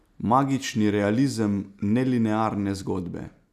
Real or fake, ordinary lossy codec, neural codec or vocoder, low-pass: real; none; none; 14.4 kHz